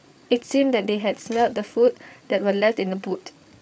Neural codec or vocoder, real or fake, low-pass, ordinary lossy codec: codec, 16 kHz, 8 kbps, FreqCodec, larger model; fake; none; none